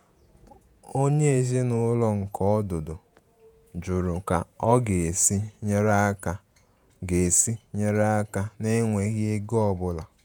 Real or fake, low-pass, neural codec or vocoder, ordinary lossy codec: fake; none; vocoder, 48 kHz, 128 mel bands, Vocos; none